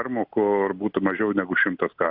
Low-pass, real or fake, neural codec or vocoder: 5.4 kHz; real; none